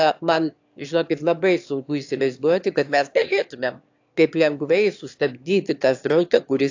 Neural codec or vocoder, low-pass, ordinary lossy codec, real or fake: autoencoder, 22.05 kHz, a latent of 192 numbers a frame, VITS, trained on one speaker; 7.2 kHz; AAC, 48 kbps; fake